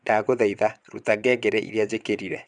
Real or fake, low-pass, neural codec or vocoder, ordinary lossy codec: fake; 9.9 kHz; vocoder, 22.05 kHz, 80 mel bands, WaveNeXt; none